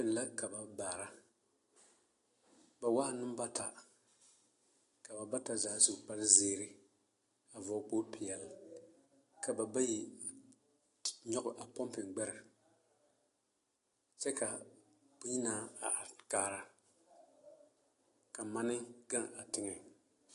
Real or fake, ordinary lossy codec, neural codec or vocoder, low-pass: real; AAC, 48 kbps; none; 10.8 kHz